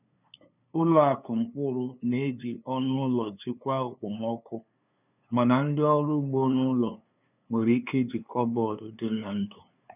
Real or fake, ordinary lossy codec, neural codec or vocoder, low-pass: fake; none; codec, 16 kHz, 2 kbps, FunCodec, trained on LibriTTS, 25 frames a second; 3.6 kHz